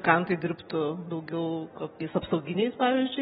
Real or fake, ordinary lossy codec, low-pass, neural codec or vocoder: real; AAC, 16 kbps; 19.8 kHz; none